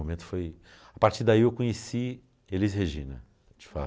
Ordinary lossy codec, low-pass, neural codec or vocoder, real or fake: none; none; none; real